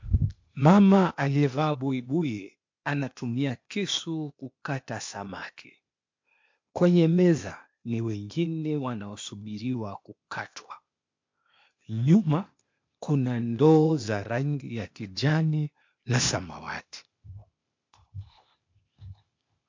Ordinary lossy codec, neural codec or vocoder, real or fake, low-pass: MP3, 48 kbps; codec, 16 kHz, 0.8 kbps, ZipCodec; fake; 7.2 kHz